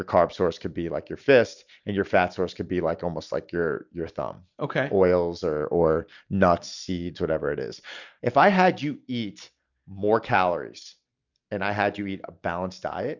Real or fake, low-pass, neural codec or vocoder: real; 7.2 kHz; none